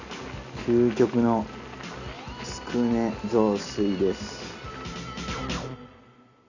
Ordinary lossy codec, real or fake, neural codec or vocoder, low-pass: none; real; none; 7.2 kHz